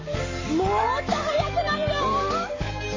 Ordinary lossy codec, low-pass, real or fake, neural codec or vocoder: MP3, 32 kbps; 7.2 kHz; fake; codec, 44.1 kHz, 7.8 kbps, Pupu-Codec